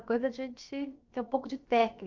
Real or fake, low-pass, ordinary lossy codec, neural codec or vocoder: fake; 7.2 kHz; Opus, 24 kbps; codec, 16 kHz, about 1 kbps, DyCAST, with the encoder's durations